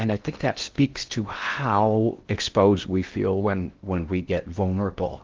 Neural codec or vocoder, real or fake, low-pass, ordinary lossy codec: codec, 16 kHz in and 24 kHz out, 0.6 kbps, FocalCodec, streaming, 2048 codes; fake; 7.2 kHz; Opus, 16 kbps